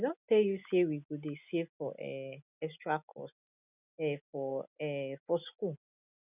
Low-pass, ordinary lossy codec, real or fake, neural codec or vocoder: 3.6 kHz; none; real; none